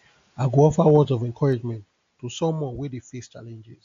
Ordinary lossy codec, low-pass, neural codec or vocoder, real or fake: AAC, 48 kbps; 7.2 kHz; none; real